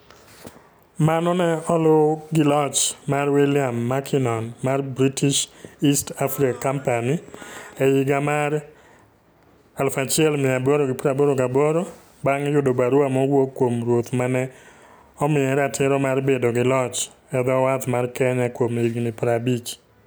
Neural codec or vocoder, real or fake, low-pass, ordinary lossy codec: none; real; none; none